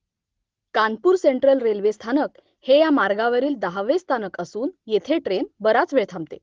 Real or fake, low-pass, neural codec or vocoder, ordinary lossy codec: real; 7.2 kHz; none; Opus, 16 kbps